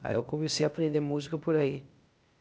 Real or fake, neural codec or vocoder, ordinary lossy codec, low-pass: fake; codec, 16 kHz, 0.8 kbps, ZipCodec; none; none